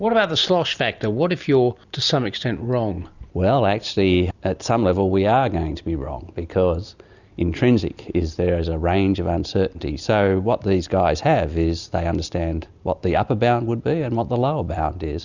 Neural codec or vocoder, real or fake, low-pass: none; real; 7.2 kHz